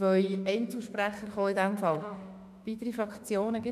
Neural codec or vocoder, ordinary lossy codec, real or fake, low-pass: autoencoder, 48 kHz, 32 numbers a frame, DAC-VAE, trained on Japanese speech; none; fake; 14.4 kHz